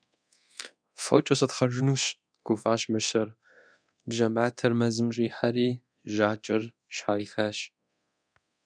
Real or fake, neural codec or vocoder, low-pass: fake; codec, 24 kHz, 0.9 kbps, DualCodec; 9.9 kHz